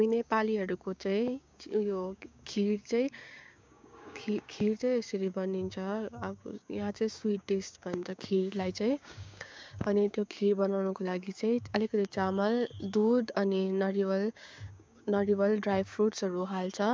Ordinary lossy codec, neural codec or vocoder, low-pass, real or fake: none; codec, 16 kHz, 6 kbps, DAC; none; fake